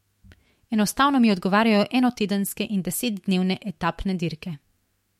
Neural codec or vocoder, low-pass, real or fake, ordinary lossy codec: autoencoder, 48 kHz, 128 numbers a frame, DAC-VAE, trained on Japanese speech; 19.8 kHz; fake; MP3, 64 kbps